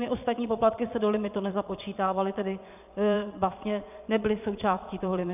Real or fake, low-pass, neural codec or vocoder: fake; 3.6 kHz; vocoder, 22.05 kHz, 80 mel bands, Vocos